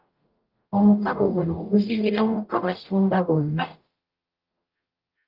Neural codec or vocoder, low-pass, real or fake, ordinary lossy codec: codec, 44.1 kHz, 0.9 kbps, DAC; 5.4 kHz; fake; Opus, 24 kbps